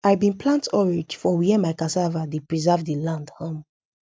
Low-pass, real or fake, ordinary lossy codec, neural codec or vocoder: none; real; none; none